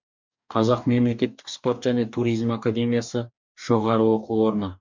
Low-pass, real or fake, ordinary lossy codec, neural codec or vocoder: 7.2 kHz; fake; MP3, 64 kbps; codec, 44.1 kHz, 2.6 kbps, DAC